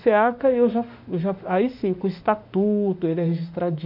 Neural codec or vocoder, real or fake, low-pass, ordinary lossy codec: autoencoder, 48 kHz, 32 numbers a frame, DAC-VAE, trained on Japanese speech; fake; 5.4 kHz; none